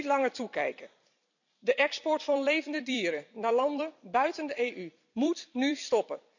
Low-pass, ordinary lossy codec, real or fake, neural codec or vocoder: 7.2 kHz; none; fake; vocoder, 44.1 kHz, 128 mel bands every 256 samples, BigVGAN v2